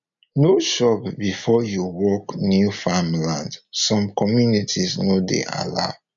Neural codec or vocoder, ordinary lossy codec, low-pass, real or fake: none; MP3, 64 kbps; 7.2 kHz; real